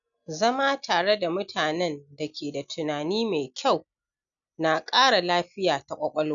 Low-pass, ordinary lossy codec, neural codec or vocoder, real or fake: 7.2 kHz; none; none; real